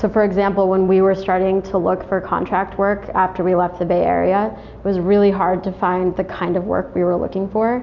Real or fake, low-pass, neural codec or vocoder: real; 7.2 kHz; none